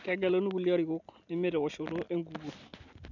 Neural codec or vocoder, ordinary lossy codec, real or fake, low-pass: none; none; real; 7.2 kHz